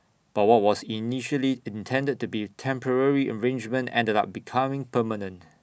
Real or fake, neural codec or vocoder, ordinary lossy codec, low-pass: real; none; none; none